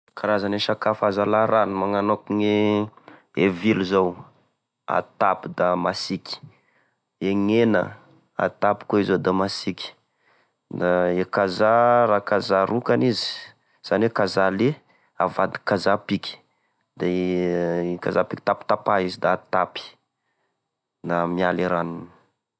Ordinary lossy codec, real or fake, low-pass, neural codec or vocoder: none; real; none; none